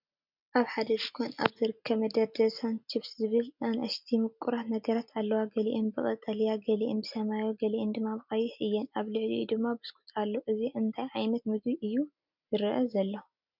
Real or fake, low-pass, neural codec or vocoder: real; 5.4 kHz; none